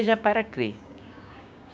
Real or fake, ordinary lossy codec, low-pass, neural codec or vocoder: fake; none; none; codec, 16 kHz, 6 kbps, DAC